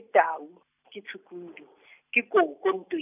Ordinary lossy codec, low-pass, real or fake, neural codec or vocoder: none; 3.6 kHz; real; none